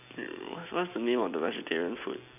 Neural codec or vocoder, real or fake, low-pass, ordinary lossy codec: none; real; 3.6 kHz; none